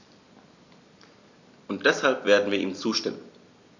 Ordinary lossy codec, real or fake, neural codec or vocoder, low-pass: none; real; none; 7.2 kHz